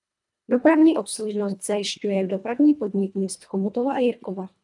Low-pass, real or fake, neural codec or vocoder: 10.8 kHz; fake; codec, 24 kHz, 1.5 kbps, HILCodec